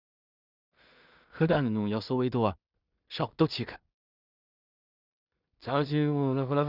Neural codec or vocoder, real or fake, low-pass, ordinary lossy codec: codec, 16 kHz in and 24 kHz out, 0.4 kbps, LongCat-Audio-Codec, two codebook decoder; fake; 5.4 kHz; Opus, 64 kbps